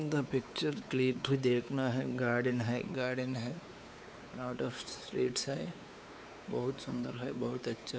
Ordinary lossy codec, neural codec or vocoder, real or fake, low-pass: none; codec, 16 kHz, 4 kbps, X-Codec, WavLM features, trained on Multilingual LibriSpeech; fake; none